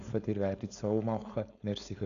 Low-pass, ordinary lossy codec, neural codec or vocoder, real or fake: 7.2 kHz; none; codec, 16 kHz, 4.8 kbps, FACodec; fake